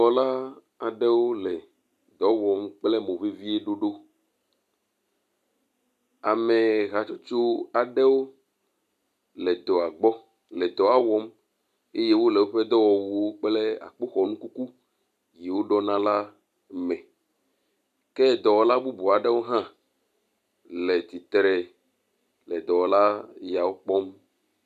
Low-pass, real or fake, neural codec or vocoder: 14.4 kHz; real; none